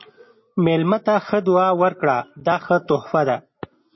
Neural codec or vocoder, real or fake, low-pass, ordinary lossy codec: none; real; 7.2 kHz; MP3, 24 kbps